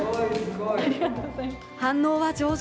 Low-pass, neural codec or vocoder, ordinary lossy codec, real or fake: none; none; none; real